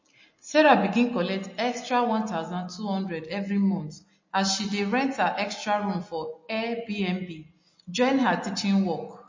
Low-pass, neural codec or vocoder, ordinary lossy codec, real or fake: 7.2 kHz; none; MP3, 32 kbps; real